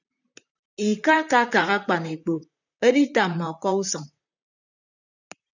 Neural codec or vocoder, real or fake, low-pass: vocoder, 44.1 kHz, 128 mel bands, Pupu-Vocoder; fake; 7.2 kHz